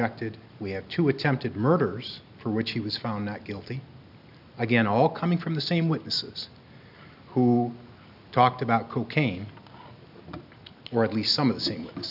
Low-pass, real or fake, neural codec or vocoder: 5.4 kHz; real; none